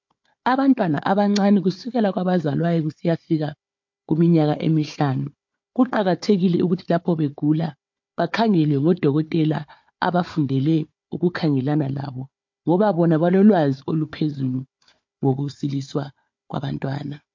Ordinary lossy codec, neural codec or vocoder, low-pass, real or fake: MP3, 48 kbps; codec, 16 kHz, 4 kbps, FunCodec, trained on Chinese and English, 50 frames a second; 7.2 kHz; fake